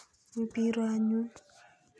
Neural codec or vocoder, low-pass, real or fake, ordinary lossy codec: none; none; real; none